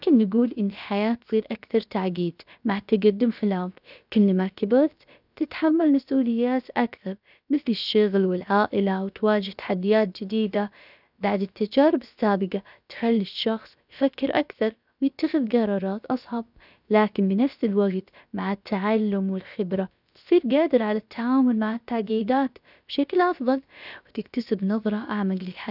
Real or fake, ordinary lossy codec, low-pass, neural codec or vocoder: fake; none; 5.4 kHz; codec, 16 kHz, about 1 kbps, DyCAST, with the encoder's durations